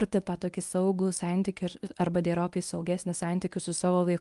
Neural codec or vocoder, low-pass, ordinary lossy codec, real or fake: codec, 24 kHz, 0.9 kbps, WavTokenizer, medium speech release version 1; 10.8 kHz; Opus, 32 kbps; fake